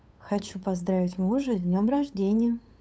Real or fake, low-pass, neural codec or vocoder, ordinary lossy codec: fake; none; codec, 16 kHz, 8 kbps, FunCodec, trained on LibriTTS, 25 frames a second; none